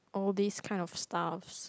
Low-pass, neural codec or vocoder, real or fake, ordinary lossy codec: none; none; real; none